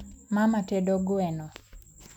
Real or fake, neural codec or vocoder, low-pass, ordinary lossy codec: real; none; 19.8 kHz; none